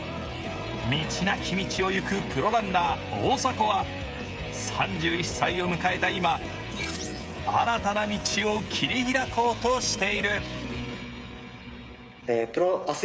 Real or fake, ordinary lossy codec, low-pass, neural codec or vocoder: fake; none; none; codec, 16 kHz, 16 kbps, FreqCodec, smaller model